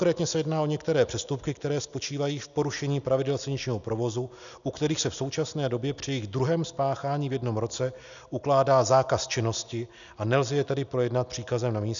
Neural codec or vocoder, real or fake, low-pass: none; real; 7.2 kHz